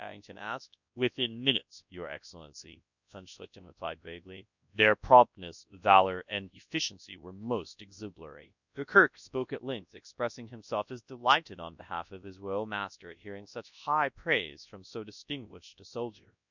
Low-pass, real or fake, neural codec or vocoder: 7.2 kHz; fake; codec, 24 kHz, 0.9 kbps, WavTokenizer, large speech release